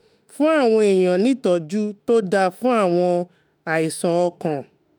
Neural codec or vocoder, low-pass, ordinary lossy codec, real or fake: autoencoder, 48 kHz, 32 numbers a frame, DAC-VAE, trained on Japanese speech; none; none; fake